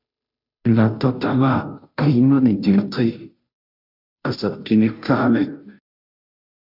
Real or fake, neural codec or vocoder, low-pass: fake; codec, 16 kHz, 0.5 kbps, FunCodec, trained on Chinese and English, 25 frames a second; 5.4 kHz